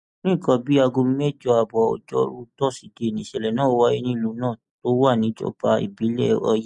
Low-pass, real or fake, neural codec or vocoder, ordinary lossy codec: 10.8 kHz; real; none; MP3, 64 kbps